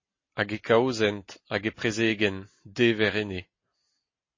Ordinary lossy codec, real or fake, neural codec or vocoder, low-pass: MP3, 32 kbps; real; none; 7.2 kHz